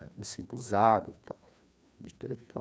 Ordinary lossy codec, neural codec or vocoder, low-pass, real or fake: none; codec, 16 kHz, 2 kbps, FreqCodec, larger model; none; fake